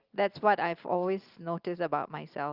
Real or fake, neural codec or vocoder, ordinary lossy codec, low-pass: real; none; Opus, 32 kbps; 5.4 kHz